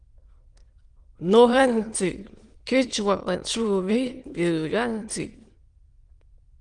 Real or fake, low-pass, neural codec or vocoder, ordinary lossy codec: fake; 9.9 kHz; autoencoder, 22.05 kHz, a latent of 192 numbers a frame, VITS, trained on many speakers; Opus, 24 kbps